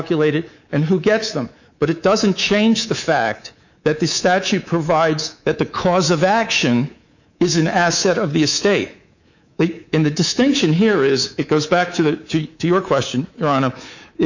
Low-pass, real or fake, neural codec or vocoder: 7.2 kHz; fake; codec, 24 kHz, 3.1 kbps, DualCodec